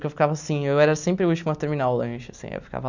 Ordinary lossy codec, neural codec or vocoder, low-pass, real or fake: none; none; 7.2 kHz; real